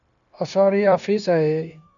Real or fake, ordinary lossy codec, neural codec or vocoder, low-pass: fake; MP3, 96 kbps; codec, 16 kHz, 0.9 kbps, LongCat-Audio-Codec; 7.2 kHz